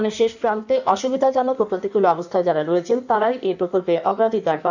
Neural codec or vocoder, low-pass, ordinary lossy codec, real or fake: codec, 16 kHz in and 24 kHz out, 1.1 kbps, FireRedTTS-2 codec; 7.2 kHz; none; fake